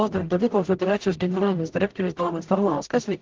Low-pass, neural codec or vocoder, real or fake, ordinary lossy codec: 7.2 kHz; codec, 44.1 kHz, 0.9 kbps, DAC; fake; Opus, 16 kbps